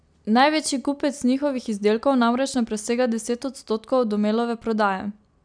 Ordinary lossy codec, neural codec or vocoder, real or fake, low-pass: none; none; real; 9.9 kHz